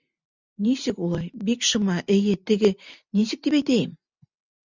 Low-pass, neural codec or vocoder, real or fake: 7.2 kHz; none; real